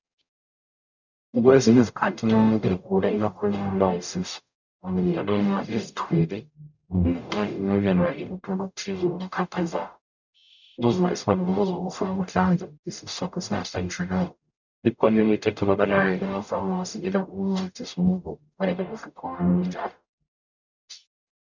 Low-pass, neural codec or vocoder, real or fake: 7.2 kHz; codec, 44.1 kHz, 0.9 kbps, DAC; fake